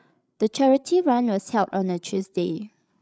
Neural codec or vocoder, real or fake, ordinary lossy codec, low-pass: codec, 16 kHz, 8 kbps, FreqCodec, larger model; fake; none; none